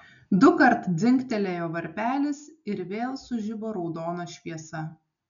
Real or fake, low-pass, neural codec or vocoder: real; 7.2 kHz; none